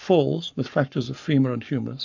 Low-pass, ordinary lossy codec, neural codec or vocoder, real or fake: 7.2 kHz; AAC, 48 kbps; codec, 44.1 kHz, 7.8 kbps, Pupu-Codec; fake